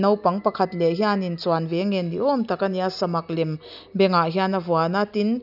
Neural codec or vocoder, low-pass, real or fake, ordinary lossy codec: none; 5.4 kHz; real; none